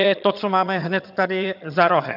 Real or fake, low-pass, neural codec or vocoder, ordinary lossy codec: fake; 5.4 kHz; vocoder, 22.05 kHz, 80 mel bands, HiFi-GAN; AAC, 48 kbps